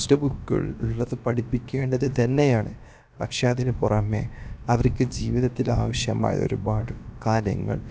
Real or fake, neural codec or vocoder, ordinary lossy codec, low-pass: fake; codec, 16 kHz, about 1 kbps, DyCAST, with the encoder's durations; none; none